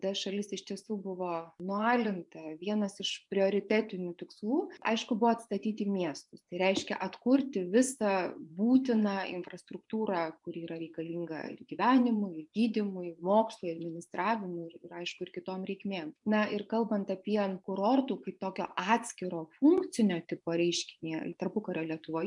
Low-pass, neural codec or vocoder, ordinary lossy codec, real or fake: 10.8 kHz; vocoder, 24 kHz, 100 mel bands, Vocos; MP3, 96 kbps; fake